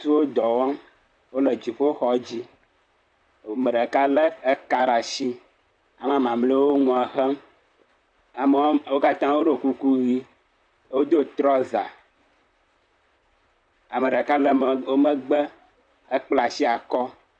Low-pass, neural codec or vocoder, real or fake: 9.9 kHz; vocoder, 44.1 kHz, 128 mel bands, Pupu-Vocoder; fake